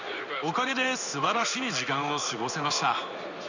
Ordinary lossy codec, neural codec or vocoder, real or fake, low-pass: none; codec, 16 kHz in and 24 kHz out, 1 kbps, XY-Tokenizer; fake; 7.2 kHz